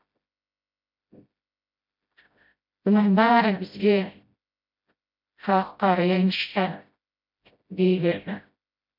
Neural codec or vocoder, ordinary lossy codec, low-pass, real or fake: codec, 16 kHz, 0.5 kbps, FreqCodec, smaller model; MP3, 48 kbps; 5.4 kHz; fake